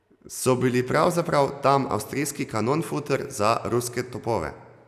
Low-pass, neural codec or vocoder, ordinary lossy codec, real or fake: 14.4 kHz; none; none; real